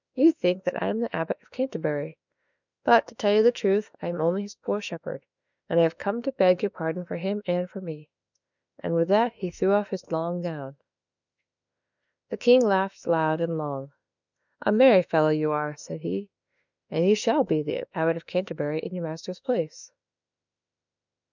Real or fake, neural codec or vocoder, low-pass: fake; autoencoder, 48 kHz, 32 numbers a frame, DAC-VAE, trained on Japanese speech; 7.2 kHz